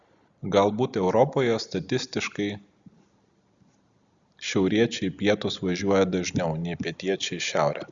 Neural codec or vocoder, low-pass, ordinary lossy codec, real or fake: none; 7.2 kHz; Opus, 64 kbps; real